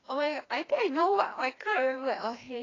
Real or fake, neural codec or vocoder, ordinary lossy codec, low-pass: fake; codec, 16 kHz, 1 kbps, FreqCodec, larger model; AAC, 32 kbps; 7.2 kHz